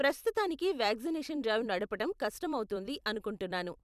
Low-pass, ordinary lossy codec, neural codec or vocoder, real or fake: 14.4 kHz; none; vocoder, 44.1 kHz, 128 mel bands every 256 samples, BigVGAN v2; fake